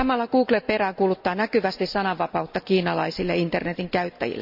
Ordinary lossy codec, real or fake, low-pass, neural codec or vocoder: none; real; 5.4 kHz; none